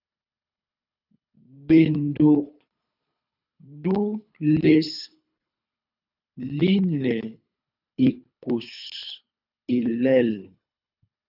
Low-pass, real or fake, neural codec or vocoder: 5.4 kHz; fake; codec, 24 kHz, 3 kbps, HILCodec